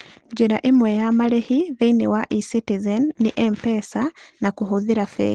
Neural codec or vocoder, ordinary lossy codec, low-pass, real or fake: none; Opus, 16 kbps; 9.9 kHz; real